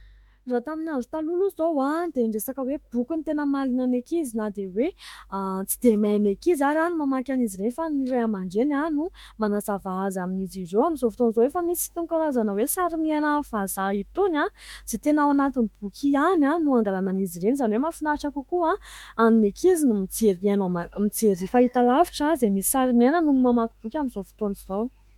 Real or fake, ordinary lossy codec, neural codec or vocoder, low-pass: fake; MP3, 96 kbps; autoencoder, 48 kHz, 32 numbers a frame, DAC-VAE, trained on Japanese speech; 19.8 kHz